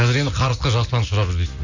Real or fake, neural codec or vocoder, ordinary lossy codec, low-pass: real; none; none; 7.2 kHz